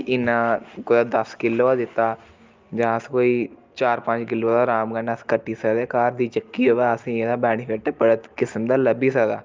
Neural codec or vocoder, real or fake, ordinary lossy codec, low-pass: none; real; Opus, 24 kbps; 7.2 kHz